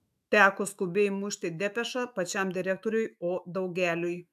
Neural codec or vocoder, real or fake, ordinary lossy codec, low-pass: autoencoder, 48 kHz, 128 numbers a frame, DAC-VAE, trained on Japanese speech; fake; AAC, 96 kbps; 14.4 kHz